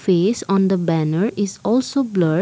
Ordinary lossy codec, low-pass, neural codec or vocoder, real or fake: none; none; none; real